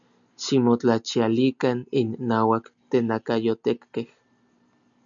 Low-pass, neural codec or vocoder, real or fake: 7.2 kHz; none; real